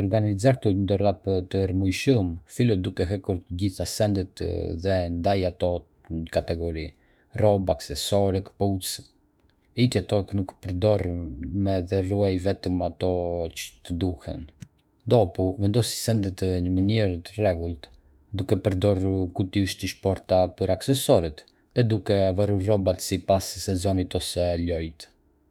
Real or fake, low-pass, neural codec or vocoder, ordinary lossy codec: fake; 19.8 kHz; autoencoder, 48 kHz, 32 numbers a frame, DAC-VAE, trained on Japanese speech; none